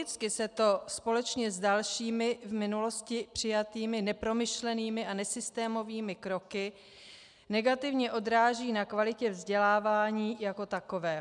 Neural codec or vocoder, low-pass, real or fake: none; 10.8 kHz; real